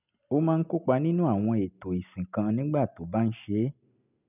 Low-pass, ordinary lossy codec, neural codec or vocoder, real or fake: 3.6 kHz; none; none; real